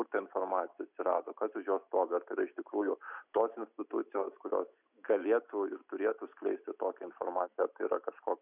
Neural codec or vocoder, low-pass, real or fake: none; 3.6 kHz; real